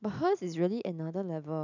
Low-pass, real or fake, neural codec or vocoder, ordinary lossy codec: 7.2 kHz; real; none; none